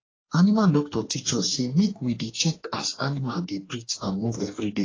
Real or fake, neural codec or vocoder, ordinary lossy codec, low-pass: fake; codec, 44.1 kHz, 2.6 kbps, DAC; AAC, 32 kbps; 7.2 kHz